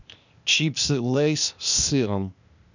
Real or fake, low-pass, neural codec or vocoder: fake; 7.2 kHz; codec, 16 kHz, 0.8 kbps, ZipCodec